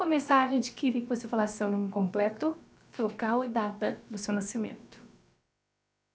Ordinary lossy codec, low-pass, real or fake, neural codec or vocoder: none; none; fake; codec, 16 kHz, about 1 kbps, DyCAST, with the encoder's durations